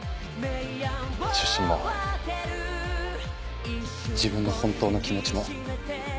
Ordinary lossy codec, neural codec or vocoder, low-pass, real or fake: none; none; none; real